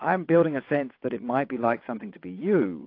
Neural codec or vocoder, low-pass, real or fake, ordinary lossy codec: vocoder, 22.05 kHz, 80 mel bands, WaveNeXt; 5.4 kHz; fake; AAC, 32 kbps